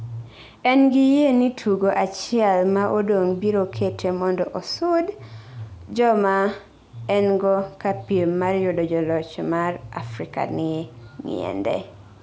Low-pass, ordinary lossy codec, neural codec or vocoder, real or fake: none; none; none; real